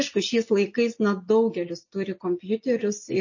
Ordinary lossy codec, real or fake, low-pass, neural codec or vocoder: MP3, 32 kbps; real; 7.2 kHz; none